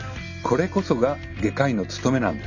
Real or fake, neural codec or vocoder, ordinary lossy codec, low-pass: real; none; none; 7.2 kHz